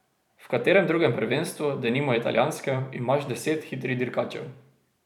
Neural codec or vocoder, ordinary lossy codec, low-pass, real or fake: vocoder, 44.1 kHz, 128 mel bands every 256 samples, BigVGAN v2; none; 19.8 kHz; fake